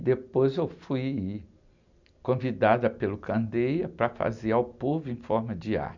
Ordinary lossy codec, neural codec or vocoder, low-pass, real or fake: none; none; 7.2 kHz; real